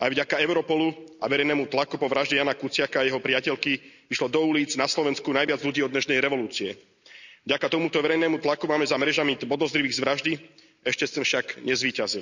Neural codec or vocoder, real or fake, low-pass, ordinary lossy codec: none; real; 7.2 kHz; none